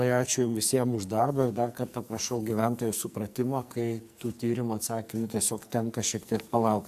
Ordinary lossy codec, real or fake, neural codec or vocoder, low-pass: AAC, 96 kbps; fake; codec, 44.1 kHz, 2.6 kbps, SNAC; 14.4 kHz